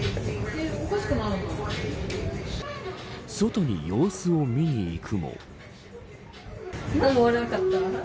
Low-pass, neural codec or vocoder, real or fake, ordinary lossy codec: none; none; real; none